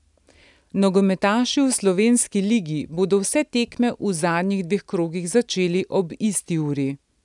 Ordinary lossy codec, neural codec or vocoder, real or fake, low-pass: none; none; real; 10.8 kHz